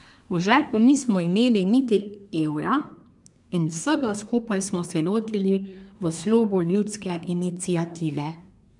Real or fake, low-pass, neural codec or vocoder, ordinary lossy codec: fake; 10.8 kHz; codec, 24 kHz, 1 kbps, SNAC; none